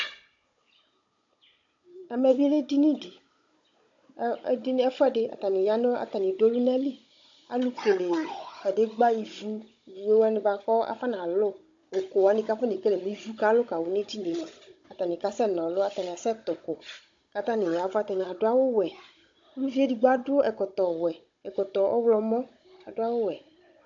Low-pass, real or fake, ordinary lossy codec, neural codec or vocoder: 7.2 kHz; fake; AAC, 48 kbps; codec, 16 kHz, 16 kbps, FunCodec, trained on Chinese and English, 50 frames a second